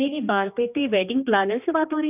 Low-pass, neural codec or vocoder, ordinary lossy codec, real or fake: 3.6 kHz; codec, 16 kHz, 2 kbps, X-Codec, HuBERT features, trained on general audio; none; fake